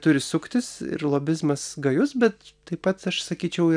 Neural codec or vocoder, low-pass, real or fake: none; 9.9 kHz; real